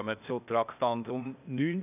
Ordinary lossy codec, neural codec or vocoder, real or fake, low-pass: none; codec, 16 kHz, 0.8 kbps, ZipCodec; fake; 3.6 kHz